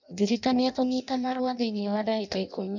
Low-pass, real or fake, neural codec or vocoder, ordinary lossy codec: 7.2 kHz; fake; codec, 16 kHz in and 24 kHz out, 0.6 kbps, FireRedTTS-2 codec; none